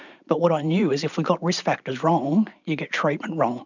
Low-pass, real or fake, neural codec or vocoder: 7.2 kHz; fake; vocoder, 44.1 kHz, 128 mel bands every 512 samples, BigVGAN v2